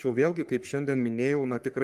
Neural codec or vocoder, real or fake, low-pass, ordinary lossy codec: codec, 44.1 kHz, 3.4 kbps, Pupu-Codec; fake; 14.4 kHz; Opus, 32 kbps